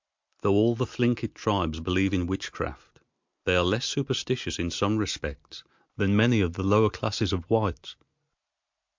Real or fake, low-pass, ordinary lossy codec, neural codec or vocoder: real; 7.2 kHz; MP3, 64 kbps; none